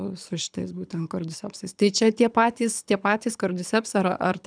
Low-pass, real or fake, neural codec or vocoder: 9.9 kHz; fake; codec, 24 kHz, 6 kbps, HILCodec